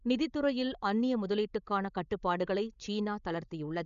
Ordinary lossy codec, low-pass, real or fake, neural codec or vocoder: none; 7.2 kHz; fake; codec, 16 kHz, 16 kbps, FreqCodec, larger model